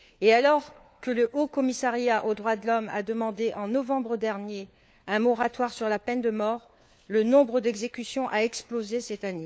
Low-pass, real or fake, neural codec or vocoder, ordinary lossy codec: none; fake; codec, 16 kHz, 4 kbps, FunCodec, trained on LibriTTS, 50 frames a second; none